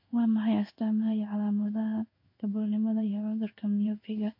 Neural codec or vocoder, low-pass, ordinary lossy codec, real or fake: codec, 16 kHz in and 24 kHz out, 1 kbps, XY-Tokenizer; 5.4 kHz; MP3, 32 kbps; fake